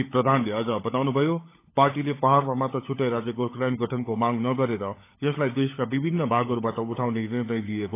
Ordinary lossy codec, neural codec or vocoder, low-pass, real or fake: AAC, 24 kbps; codec, 16 kHz, 16 kbps, FunCodec, trained on LibriTTS, 50 frames a second; 3.6 kHz; fake